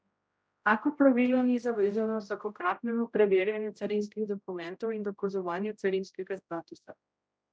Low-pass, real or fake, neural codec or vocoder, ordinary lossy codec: none; fake; codec, 16 kHz, 0.5 kbps, X-Codec, HuBERT features, trained on general audio; none